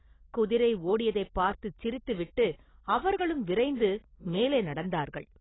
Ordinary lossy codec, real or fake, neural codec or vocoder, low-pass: AAC, 16 kbps; real; none; 7.2 kHz